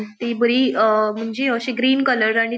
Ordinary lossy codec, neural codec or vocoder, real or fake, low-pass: none; none; real; none